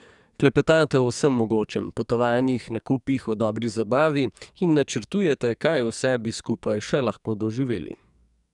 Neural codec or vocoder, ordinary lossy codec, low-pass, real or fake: codec, 44.1 kHz, 2.6 kbps, SNAC; none; 10.8 kHz; fake